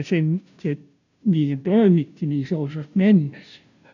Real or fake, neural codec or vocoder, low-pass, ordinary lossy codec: fake; codec, 16 kHz, 0.5 kbps, FunCodec, trained on Chinese and English, 25 frames a second; 7.2 kHz; none